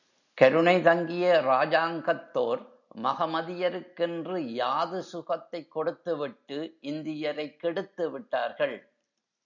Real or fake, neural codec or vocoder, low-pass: real; none; 7.2 kHz